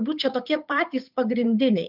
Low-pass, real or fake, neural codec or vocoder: 5.4 kHz; fake; codec, 16 kHz, 8 kbps, FunCodec, trained on Chinese and English, 25 frames a second